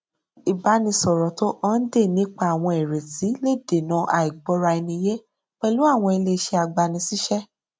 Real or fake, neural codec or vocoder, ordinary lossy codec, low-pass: real; none; none; none